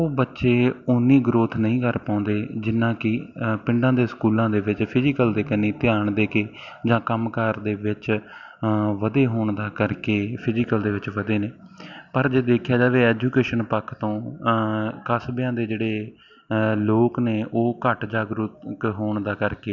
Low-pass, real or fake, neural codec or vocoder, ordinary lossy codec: 7.2 kHz; real; none; none